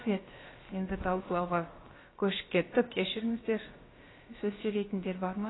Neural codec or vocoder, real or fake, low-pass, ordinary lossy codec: codec, 16 kHz, about 1 kbps, DyCAST, with the encoder's durations; fake; 7.2 kHz; AAC, 16 kbps